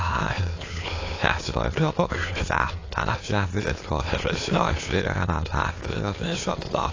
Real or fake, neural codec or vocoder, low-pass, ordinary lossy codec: fake; autoencoder, 22.05 kHz, a latent of 192 numbers a frame, VITS, trained on many speakers; 7.2 kHz; AAC, 32 kbps